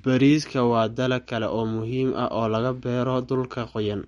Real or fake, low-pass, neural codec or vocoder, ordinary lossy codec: real; 19.8 kHz; none; MP3, 48 kbps